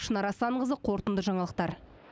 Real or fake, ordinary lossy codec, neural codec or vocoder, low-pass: real; none; none; none